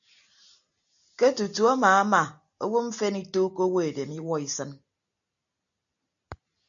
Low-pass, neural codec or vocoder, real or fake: 7.2 kHz; none; real